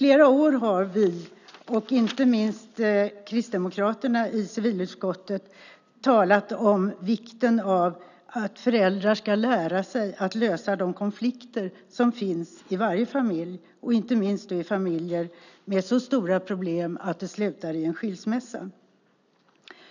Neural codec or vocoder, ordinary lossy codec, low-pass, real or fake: none; none; 7.2 kHz; real